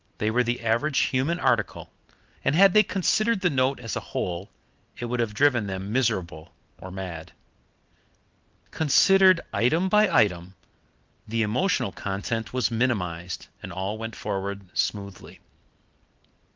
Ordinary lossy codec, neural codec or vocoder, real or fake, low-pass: Opus, 32 kbps; none; real; 7.2 kHz